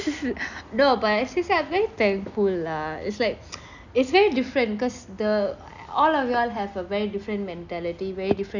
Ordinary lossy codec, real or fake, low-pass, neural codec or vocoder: none; real; 7.2 kHz; none